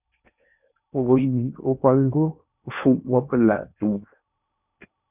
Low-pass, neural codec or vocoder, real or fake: 3.6 kHz; codec, 16 kHz in and 24 kHz out, 0.8 kbps, FocalCodec, streaming, 65536 codes; fake